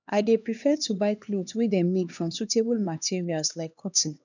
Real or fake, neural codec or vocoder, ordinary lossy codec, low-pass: fake; codec, 16 kHz, 2 kbps, X-Codec, HuBERT features, trained on LibriSpeech; none; 7.2 kHz